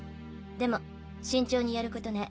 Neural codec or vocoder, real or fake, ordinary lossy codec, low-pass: none; real; none; none